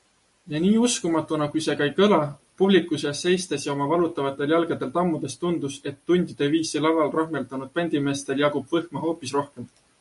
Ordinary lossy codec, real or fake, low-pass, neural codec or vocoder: MP3, 48 kbps; real; 14.4 kHz; none